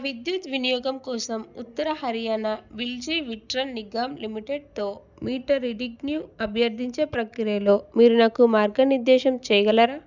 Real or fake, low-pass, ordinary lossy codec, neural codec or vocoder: real; 7.2 kHz; none; none